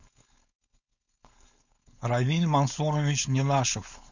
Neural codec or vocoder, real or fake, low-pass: codec, 16 kHz, 4.8 kbps, FACodec; fake; 7.2 kHz